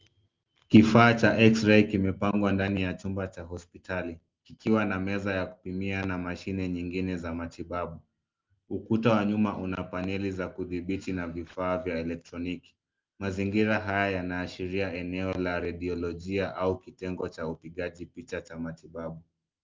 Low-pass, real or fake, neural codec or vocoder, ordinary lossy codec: 7.2 kHz; real; none; Opus, 24 kbps